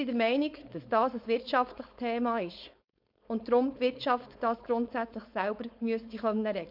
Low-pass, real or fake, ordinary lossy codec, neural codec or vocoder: 5.4 kHz; fake; MP3, 48 kbps; codec, 16 kHz, 4.8 kbps, FACodec